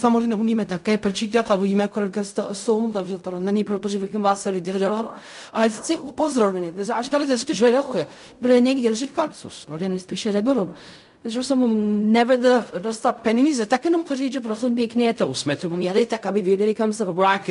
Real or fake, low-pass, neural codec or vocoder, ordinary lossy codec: fake; 10.8 kHz; codec, 16 kHz in and 24 kHz out, 0.4 kbps, LongCat-Audio-Codec, fine tuned four codebook decoder; MP3, 96 kbps